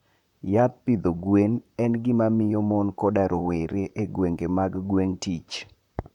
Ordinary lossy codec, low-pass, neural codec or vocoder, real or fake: none; 19.8 kHz; vocoder, 44.1 kHz, 128 mel bands, Pupu-Vocoder; fake